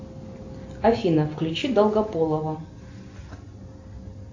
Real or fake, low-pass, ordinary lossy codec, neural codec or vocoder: real; 7.2 kHz; AAC, 48 kbps; none